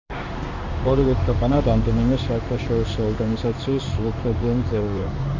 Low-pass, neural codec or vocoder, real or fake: 7.2 kHz; codec, 16 kHz in and 24 kHz out, 1 kbps, XY-Tokenizer; fake